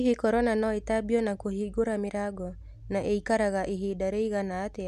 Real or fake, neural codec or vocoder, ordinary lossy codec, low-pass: real; none; none; 14.4 kHz